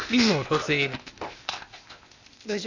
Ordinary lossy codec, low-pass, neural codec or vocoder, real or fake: none; 7.2 kHz; codec, 16 kHz, 0.8 kbps, ZipCodec; fake